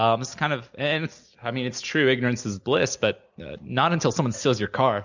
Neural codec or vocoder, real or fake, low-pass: none; real; 7.2 kHz